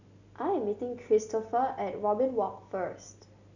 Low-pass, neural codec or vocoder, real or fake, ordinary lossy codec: 7.2 kHz; none; real; none